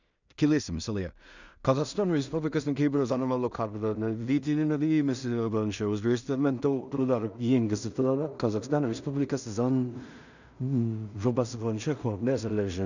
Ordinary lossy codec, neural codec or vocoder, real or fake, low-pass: none; codec, 16 kHz in and 24 kHz out, 0.4 kbps, LongCat-Audio-Codec, two codebook decoder; fake; 7.2 kHz